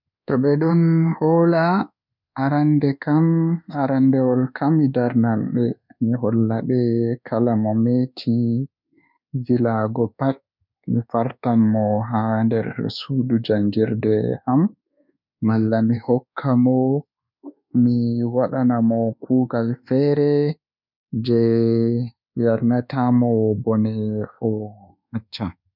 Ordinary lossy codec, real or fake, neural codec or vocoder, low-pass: none; fake; codec, 24 kHz, 1.2 kbps, DualCodec; 5.4 kHz